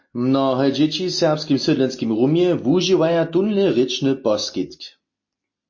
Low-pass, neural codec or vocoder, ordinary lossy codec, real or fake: 7.2 kHz; none; MP3, 32 kbps; real